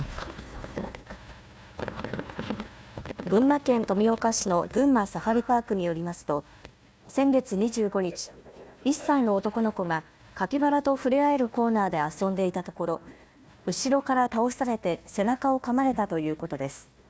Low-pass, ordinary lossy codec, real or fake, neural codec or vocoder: none; none; fake; codec, 16 kHz, 1 kbps, FunCodec, trained on Chinese and English, 50 frames a second